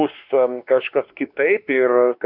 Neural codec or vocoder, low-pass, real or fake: codec, 16 kHz, 2 kbps, X-Codec, WavLM features, trained on Multilingual LibriSpeech; 5.4 kHz; fake